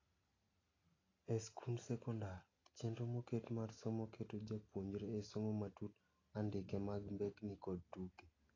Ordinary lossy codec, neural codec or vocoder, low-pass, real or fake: AAC, 32 kbps; none; 7.2 kHz; real